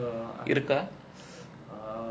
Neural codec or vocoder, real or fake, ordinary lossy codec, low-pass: none; real; none; none